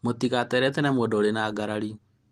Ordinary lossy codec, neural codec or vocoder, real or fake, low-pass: Opus, 24 kbps; none; real; 14.4 kHz